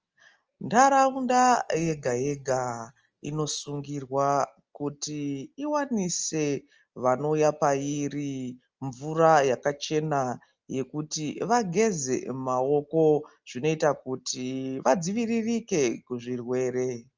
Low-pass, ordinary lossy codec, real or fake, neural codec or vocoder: 7.2 kHz; Opus, 24 kbps; real; none